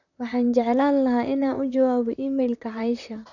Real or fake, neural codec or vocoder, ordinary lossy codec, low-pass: fake; vocoder, 44.1 kHz, 128 mel bands, Pupu-Vocoder; none; 7.2 kHz